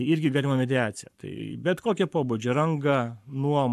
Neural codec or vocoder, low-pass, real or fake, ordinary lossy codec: codec, 44.1 kHz, 7.8 kbps, Pupu-Codec; 14.4 kHz; fake; AAC, 96 kbps